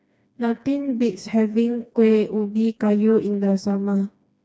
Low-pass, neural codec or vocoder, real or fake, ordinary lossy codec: none; codec, 16 kHz, 2 kbps, FreqCodec, smaller model; fake; none